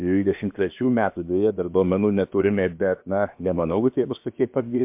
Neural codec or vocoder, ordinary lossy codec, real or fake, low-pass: codec, 16 kHz, 0.7 kbps, FocalCodec; MP3, 32 kbps; fake; 3.6 kHz